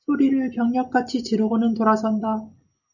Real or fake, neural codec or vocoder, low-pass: real; none; 7.2 kHz